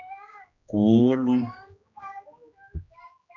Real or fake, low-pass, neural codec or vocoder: fake; 7.2 kHz; codec, 16 kHz, 1 kbps, X-Codec, HuBERT features, trained on general audio